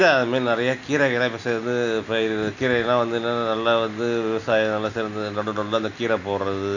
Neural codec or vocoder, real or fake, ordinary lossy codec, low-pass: none; real; none; 7.2 kHz